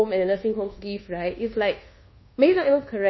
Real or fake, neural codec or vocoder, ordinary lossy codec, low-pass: fake; codec, 24 kHz, 1.2 kbps, DualCodec; MP3, 24 kbps; 7.2 kHz